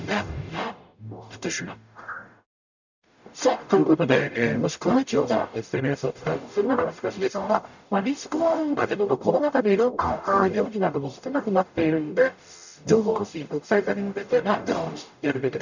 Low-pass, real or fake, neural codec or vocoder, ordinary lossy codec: 7.2 kHz; fake; codec, 44.1 kHz, 0.9 kbps, DAC; none